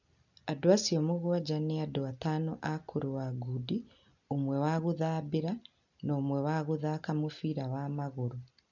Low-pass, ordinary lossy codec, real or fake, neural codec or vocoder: 7.2 kHz; none; real; none